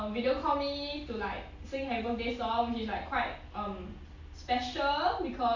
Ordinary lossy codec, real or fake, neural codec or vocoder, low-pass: AAC, 48 kbps; real; none; 7.2 kHz